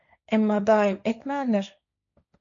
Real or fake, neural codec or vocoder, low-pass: fake; codec, 16 kHz, 1.1 kbps, Voila-Tokenizer; 7.2 kHz